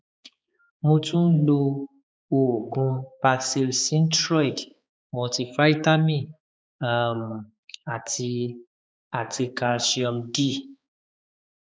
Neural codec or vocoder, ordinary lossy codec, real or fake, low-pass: codec, 16 kHz, 4 kbps, X-Codec, HuBERT features, trained on balanced general audio; none; fake; none